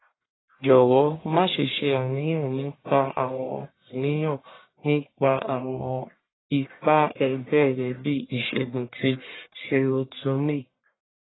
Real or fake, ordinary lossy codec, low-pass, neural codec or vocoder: fake; AAC, 16 kbps; 7.2 kHz; codec, 44.1 kHz, 1.7 kbps, Pupu-Codec